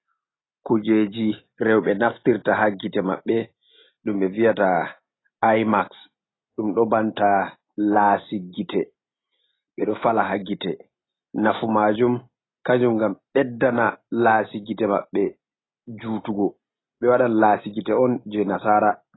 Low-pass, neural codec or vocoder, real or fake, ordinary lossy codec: 7.2 kHz; none; real; AAC, 16 kbps